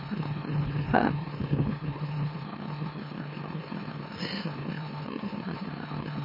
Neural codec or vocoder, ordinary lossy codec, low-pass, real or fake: autoencoder, 44.1 kHz, a latent of 192 numbers a frame, MeloTTS; MP3, 24 kbps; 5.4 kHz; fake